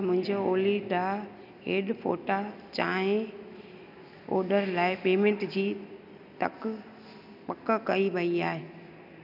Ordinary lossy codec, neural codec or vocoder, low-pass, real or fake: none; none; 5.4 kHz; real